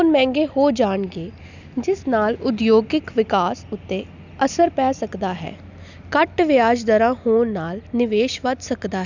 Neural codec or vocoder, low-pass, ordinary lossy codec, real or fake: none; 7.2 kHz; none; real